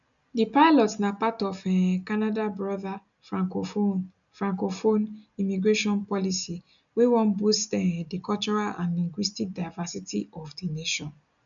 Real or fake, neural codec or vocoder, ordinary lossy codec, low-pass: real; none; none; 7.2 kHz